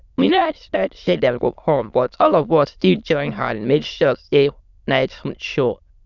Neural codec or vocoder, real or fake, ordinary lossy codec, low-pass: autoencoder, 22.05 kHz, a latent of 192 numbers a frame, VITS, trained on many speakers; fake; none; 7.2 kHz